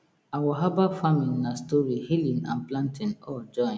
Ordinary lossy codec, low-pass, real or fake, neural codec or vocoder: none; none; real; none